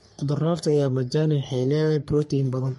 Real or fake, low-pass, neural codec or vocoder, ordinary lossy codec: fake; 14.4 kHz; codec, 44.1 kHz, 3.4 kbps, Pupu-Codec; MP3, 48 kbps